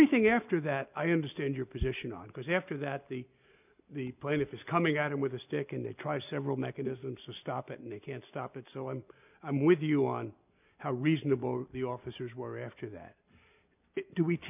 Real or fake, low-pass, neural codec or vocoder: real; 3.6 kHz; none